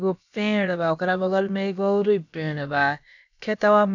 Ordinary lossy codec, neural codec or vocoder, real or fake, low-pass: none; codec, 16 kHz, about 1 kbps, DyCAST, with the encoder's durations; fake; 7.2 kHz